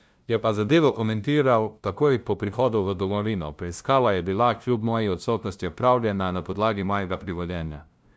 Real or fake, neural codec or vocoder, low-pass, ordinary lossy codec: fake; codec, 16 kHz, 0.5 kbps, FunCodec, trained on LibriTTS, 25 frames a second; none; none